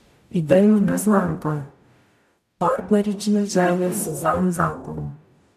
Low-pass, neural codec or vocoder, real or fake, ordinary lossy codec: 14.4 kHz; codec, 44.1 kHz, 0.9 kbps, DAC; fake; none